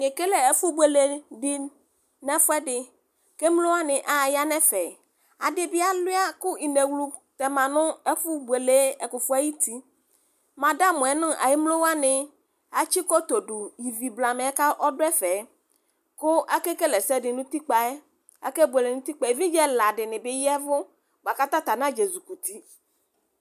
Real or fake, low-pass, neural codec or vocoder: real; 14.4 kHz; none